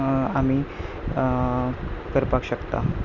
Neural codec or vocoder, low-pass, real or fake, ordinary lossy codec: none; 7.2 kHz; real; none